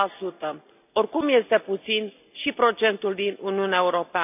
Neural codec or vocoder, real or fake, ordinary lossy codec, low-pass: none; real; none; 3.6 kHz